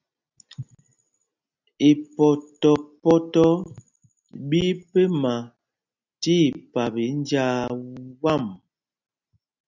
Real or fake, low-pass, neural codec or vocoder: real; 7.2 kHz; none